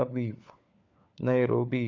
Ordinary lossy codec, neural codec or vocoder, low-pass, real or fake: MP3, 64 kbps; codec, 16 kHz, 4 kbps, FunCodec, trained on LibriTTS, 50 frames a second; 7.2 kHz; fake